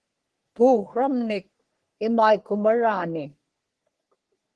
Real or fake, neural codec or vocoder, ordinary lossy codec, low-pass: fake; codec, 44.1 kHz, 3.4 kbps, Pupu-Codec; Opus, 16 kbps; 10.8 kHz